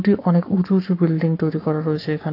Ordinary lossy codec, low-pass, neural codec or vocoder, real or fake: AAC, 32 kbps; 5.4 kHz; codec, 44.1 kHz, 7.8 kbps, Pupu-Codec; fake